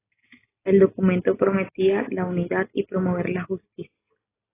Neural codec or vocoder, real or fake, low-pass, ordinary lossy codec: none; real; 3.6 kHz; AAC, 16 kbps